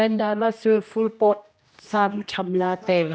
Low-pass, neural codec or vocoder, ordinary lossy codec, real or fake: none; codec, 16 kHz, 1 kbps, X-Codec, HuBERT features, trained on general audio; none; fake